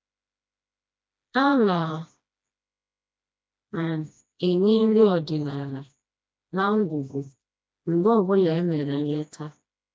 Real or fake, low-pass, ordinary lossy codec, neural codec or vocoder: fake; none; none; codec, 16 kHz, 1 kbps, FreqCodec, smaller model